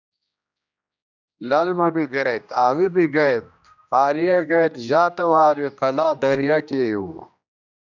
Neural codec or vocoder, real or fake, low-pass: codec, 16 kHz, 1 kbps, X-Codec, HuBERT features, trained on general audio; fake; 7.2 kHz